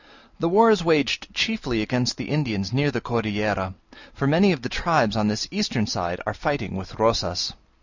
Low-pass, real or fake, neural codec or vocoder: 7.2 kHz; real; none